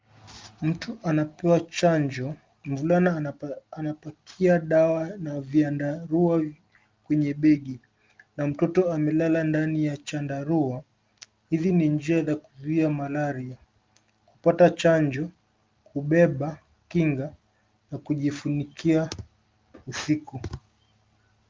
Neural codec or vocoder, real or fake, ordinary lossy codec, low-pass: none; real; Opus, 32 kbps; 7.2 kHz